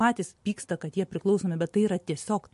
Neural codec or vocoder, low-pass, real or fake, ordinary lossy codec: none; 14.4 kHz; real; MP3, 48 kbps